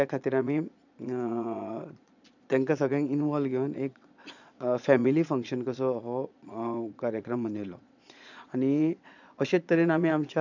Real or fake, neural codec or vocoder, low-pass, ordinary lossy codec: fake; vocoder, 22.05 kHz, 80 mel bands, Vocos; 7.2 kHz; none